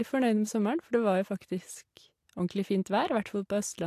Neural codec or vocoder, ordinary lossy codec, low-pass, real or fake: none; AAC, 64 kbps; 14.4 kHz; real